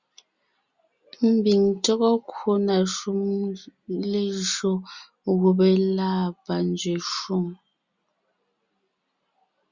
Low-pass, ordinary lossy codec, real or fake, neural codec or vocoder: 7.2 kHz; Opus, 64 kbps; real; none